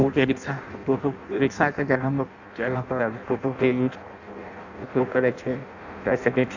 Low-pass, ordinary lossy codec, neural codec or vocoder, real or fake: 7.2 kHz; none; codec, 16 kHz in and 24 kHz out, 0.6 kbps, FireRedTTS-2 codec; fake